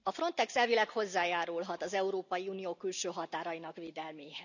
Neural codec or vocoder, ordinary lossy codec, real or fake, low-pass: none; none; real; 7.2 kHz